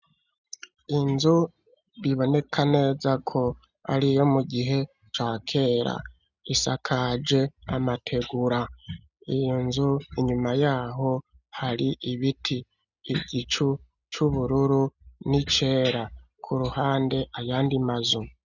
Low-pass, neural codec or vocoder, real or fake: 7.2 kHz; none; real